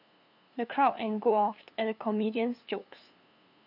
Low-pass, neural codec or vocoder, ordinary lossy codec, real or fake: 5.4 kHz; codec, 16 kHz, 2 kbps, FunCodec, trained on LibriTTS, 25 frames a second; none; fake